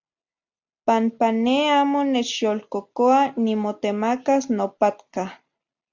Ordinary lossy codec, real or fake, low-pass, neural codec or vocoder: MP3, 64 kbps; real; 7.2 kHz; none